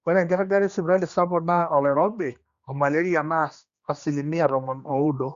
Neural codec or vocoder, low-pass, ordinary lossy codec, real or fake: codec, 16 kHz, 2 kbps, X-Codec, HuBERT features, trained on general audio; 7.2 kHz; Opus, 64 kbps; fake